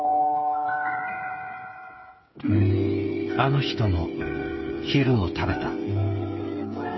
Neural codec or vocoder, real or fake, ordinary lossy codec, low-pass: codec, 16 kHz, 8 kbps, FreqCodec, smaller model; fake; MP3, 24 kbps; 7.2 kHz